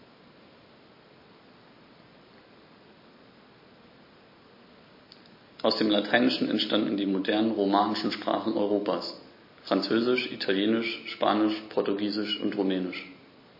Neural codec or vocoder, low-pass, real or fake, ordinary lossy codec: none; 5.4 kHz; real; MP3, 24 kbps